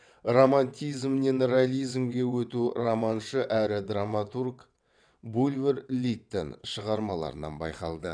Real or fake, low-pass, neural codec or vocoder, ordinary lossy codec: fake; 9.9 kHz; vocoder, 22.05 kHz, 80 mel bands, WaveNeXt; none